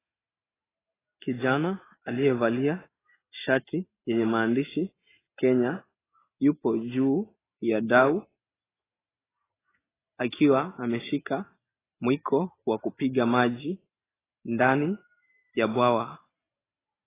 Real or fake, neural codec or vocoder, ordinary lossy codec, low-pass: real; none; AAC, 16 kbps; 3.6 kHz